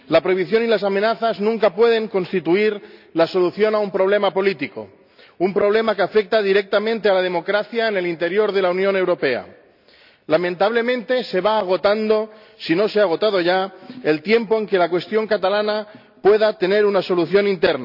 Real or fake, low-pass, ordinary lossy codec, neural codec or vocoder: real; 5.4 kHz; none; none